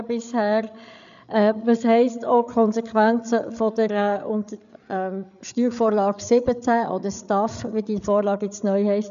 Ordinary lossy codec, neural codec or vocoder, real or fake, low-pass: none; codec, 16 kHz, 8 kbps, FreqCodec, larger model; fake; 7.2 kHz